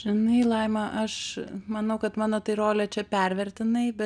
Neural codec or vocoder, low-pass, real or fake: none; 9.9 kHz; real